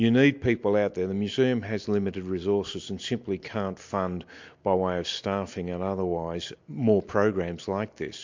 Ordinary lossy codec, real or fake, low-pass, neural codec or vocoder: MP3, 48 kbps; real; 7.2 kHz; none